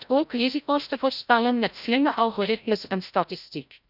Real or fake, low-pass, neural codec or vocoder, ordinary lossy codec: fake; 5.4 kHz; codec, 16 kHz, 0.5 kbps, FreqCodec, larger model; none